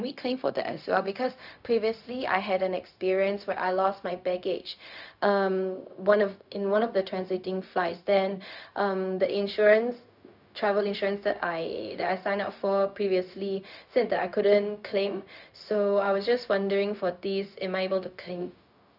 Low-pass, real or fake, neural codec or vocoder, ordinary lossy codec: 5.4 kHz; fake; codec, 16 kHz, 0.4 kbps, LongCat-Audio-Codec; none